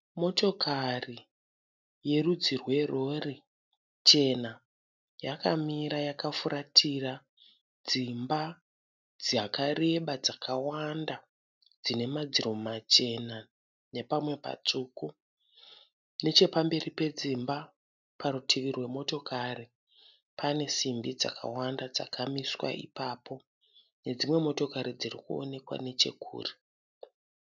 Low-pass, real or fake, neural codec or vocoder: 7.2 kHz; real; none